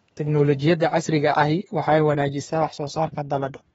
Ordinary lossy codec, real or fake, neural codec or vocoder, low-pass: AAC, 24 kbps; fake; codec, 32 kHz, 1.9 kbps, SNAC; 14.4 kHz